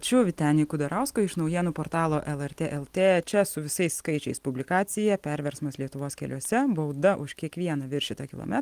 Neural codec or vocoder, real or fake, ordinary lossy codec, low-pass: none; real; Opus, 24 kbps; 14.4 kHz